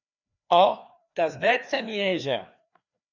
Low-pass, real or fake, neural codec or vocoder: 7.2 kHz; fake; codec, 16 kHz, 2 kbps, FreqCodec, larger model